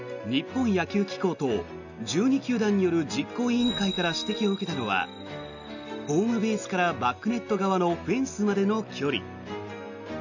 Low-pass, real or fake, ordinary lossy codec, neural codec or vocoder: 7.2 kHz; real; none; none